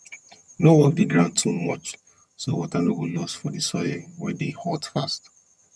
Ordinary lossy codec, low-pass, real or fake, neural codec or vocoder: none; none; fake; vocoder, 22.05 kHz, 80 mel bands, HiFi-GAN